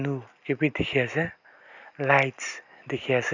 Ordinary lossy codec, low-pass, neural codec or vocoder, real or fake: none; 7.2 kHz; none; real